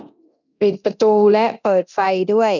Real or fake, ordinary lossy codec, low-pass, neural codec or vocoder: fake; none; 7.2 kHz; codec, 24 kHz, 0.9 kbps, DualCodec